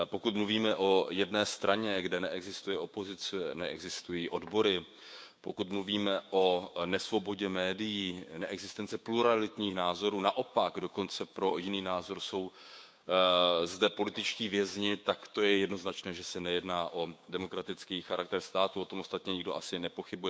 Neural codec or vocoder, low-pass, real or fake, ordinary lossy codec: codec, 16 kHz, 6 kbps, DAC; none; fake; none